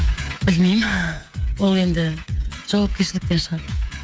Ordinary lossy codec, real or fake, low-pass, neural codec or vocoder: none; fake; none; codec, 16 kHz, 8 kbps, FreqCodec, smaller model